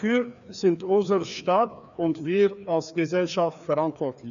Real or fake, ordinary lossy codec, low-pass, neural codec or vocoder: fake; none; 7.2 kHz; codec, 16 kHz, 2 kbps, FreqCodec, larger model